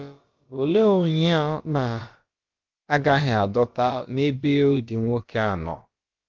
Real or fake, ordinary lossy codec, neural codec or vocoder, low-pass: fake; Opus, 32 kbps; codec, 16 kHz, about 1 kbps, DyCAST, with the encoder's durations; 7.2 kHz